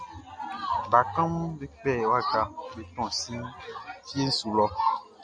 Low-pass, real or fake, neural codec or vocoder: 9.9 kHz; real; none